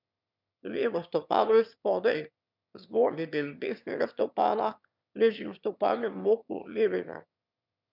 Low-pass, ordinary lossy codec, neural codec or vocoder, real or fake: 5.4 kHz; none; autoencoder, 22.05 kHz, a latent of 192 numbers a frame, VITS, trained on one speaker; fake